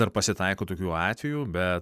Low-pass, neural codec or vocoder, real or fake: 14.4 kHz; none; real